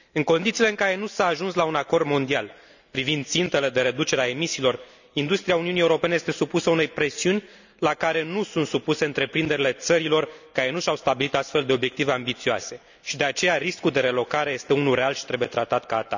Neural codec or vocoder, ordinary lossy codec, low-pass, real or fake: none; none; 7.2 kHz; real